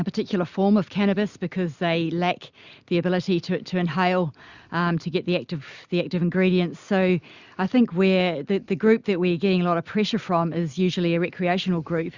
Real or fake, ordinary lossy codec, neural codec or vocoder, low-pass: real; Opus, 64 kbps; none; 7.2 kHz